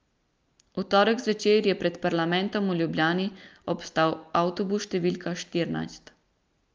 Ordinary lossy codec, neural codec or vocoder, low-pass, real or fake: Opus, 24 kbps; none; 7.2 kHz; real